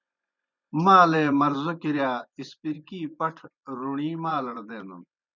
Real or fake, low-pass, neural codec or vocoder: fake; 7.2 kHz; vocoder, 24 kHz, 100 mel bands, Vocos